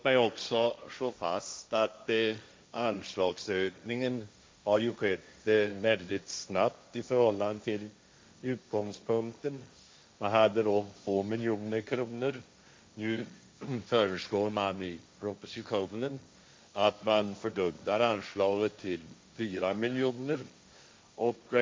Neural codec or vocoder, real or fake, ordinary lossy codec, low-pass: codec, 16 kHz, 1.1 kbps, Voila-Tokenizer; fake; none; none